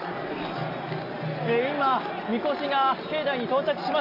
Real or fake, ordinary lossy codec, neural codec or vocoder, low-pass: real; none; none; 5.4 kHz